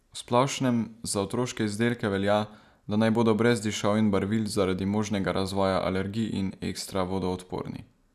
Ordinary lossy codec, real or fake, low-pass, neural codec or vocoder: none; real; 14.4 kHz; none